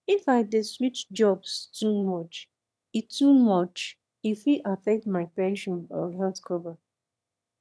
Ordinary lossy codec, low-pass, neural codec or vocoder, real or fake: none; none; autoencoder, 22.05 kHz, a latent of 192 numbers a frame, VITS, trained on one speaker; fake